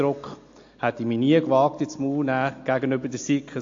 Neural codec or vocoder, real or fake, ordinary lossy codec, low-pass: none; real; AAC, 48 kbps; 7.2 kHz